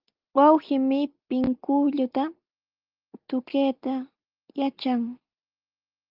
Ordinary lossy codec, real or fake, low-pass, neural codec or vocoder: Opus, 24 kbps; real; 5.4 kHz; none